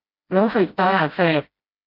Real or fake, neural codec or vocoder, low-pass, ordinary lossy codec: fake; codec, 16 kHz, 0.5 kbps, FreqCodec, smaller model; 5.4 kHz; none